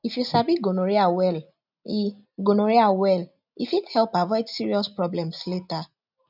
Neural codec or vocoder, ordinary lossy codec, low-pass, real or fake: none; none; 5.4 kHz; real